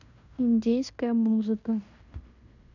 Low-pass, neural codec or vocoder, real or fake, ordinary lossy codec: 7.2 kHz; codec, 16 kHz in and 24 kHz out, 0.9 kbps, LongCat-Audio-Codec, fine tuned four codebook decoder; fake; none